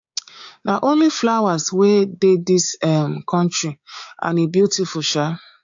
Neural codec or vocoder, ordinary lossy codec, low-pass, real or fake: codec, 16 kHz, 6 kbps, DAC; AAC, 64 kbps; 7.2 kHz; fake